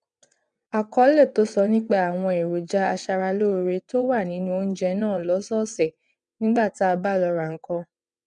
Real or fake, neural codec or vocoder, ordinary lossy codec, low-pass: fake; vocoder, 22.05 kHz, 80 mel bands, WaveNeXt; MP3, 96 kbps; 9.9 kHz